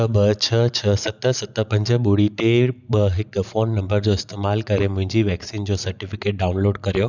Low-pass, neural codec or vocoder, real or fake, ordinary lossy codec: 7.2 kHz; none; real; none